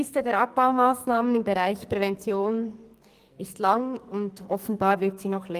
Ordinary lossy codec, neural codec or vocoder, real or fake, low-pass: Opus, 32 kbps; codec, 44.1 kHz, 2.6 kbps, SNAC; fake; 14.4 kHz